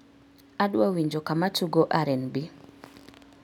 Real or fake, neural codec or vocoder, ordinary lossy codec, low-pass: real; none; none; 19.8 kHz